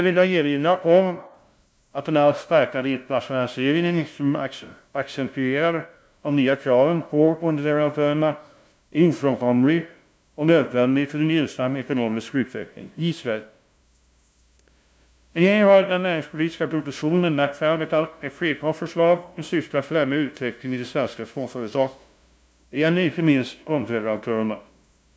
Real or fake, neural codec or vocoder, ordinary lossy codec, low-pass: fake; codec, 16 kHz, 0.5 kbps, FunCodec, trained on LibriTTS, 25 frames a second; none; none